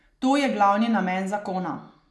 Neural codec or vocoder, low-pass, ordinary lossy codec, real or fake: none; none; none; real